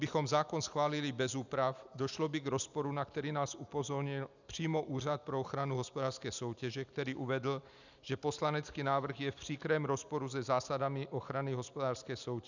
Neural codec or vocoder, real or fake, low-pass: none; real; 7.2 kHz